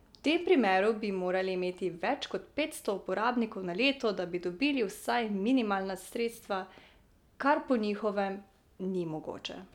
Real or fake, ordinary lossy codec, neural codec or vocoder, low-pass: real; none; none; 19.8 kHz